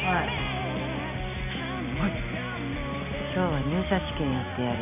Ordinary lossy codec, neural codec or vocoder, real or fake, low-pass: none; none; real; 3.6 kHz